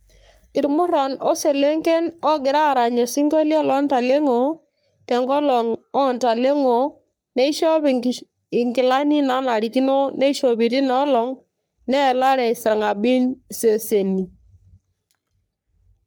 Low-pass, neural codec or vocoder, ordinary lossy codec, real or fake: none; codec, 44.1 kHz, 3.4 kbps, Pupu-Codec; none; fake